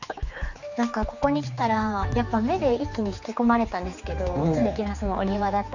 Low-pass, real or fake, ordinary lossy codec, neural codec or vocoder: 7.2 kHz; fake; none; codec, 16 kHz, 4 kbps, X-Codec, HuBERT features, trained on general audio